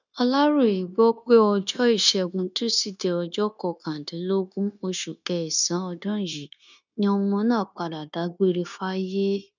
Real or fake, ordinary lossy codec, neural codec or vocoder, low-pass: fake; none; codec, 16 kHz, 0.9 kbps, LongCat-Audio-Codec; 7.2 kHz